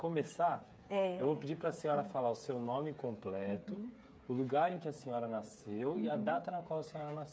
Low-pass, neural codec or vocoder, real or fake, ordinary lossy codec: none; codec, 16 kHz, 8 kbps, FreqCodec, smaller model; fake; none